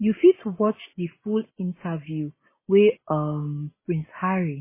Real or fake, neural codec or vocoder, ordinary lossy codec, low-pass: real; none; MP3, 16 kbps; 3.6 kHz